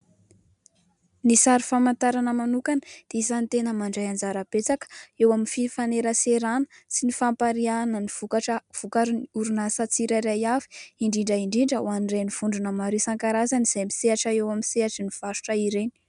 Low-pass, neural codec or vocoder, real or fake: 10.8 kHz; none; real